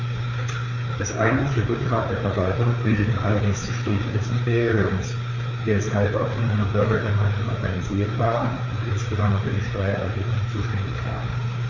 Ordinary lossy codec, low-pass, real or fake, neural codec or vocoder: none; 7.2 kHz; fake; codec, 16 kHz, 4 kbps, FreqCodec, larger model